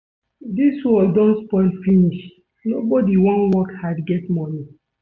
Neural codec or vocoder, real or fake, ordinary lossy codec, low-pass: none; real; MP3, 48 kbps; 7.2 kHz